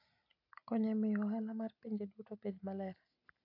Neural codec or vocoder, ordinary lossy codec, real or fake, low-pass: none; AAC, 48 kbps; real; 5.4 kHz